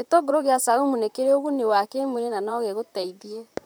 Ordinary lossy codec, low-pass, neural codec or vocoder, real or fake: none; none; vocoder, 44.1 kHz, 128 mel bands, Pupu-Vocoder; fake